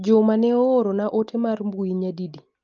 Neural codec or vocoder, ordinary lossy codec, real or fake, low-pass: none; Opus, 24 kbps; real; 7.2 kHz